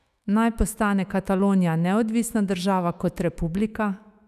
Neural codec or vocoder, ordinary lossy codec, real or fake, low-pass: autoencoder, 48 kHz, 128 numbers a frame, DAC-VAE, trained on Japanese speech; none; fake; 14.4 kHz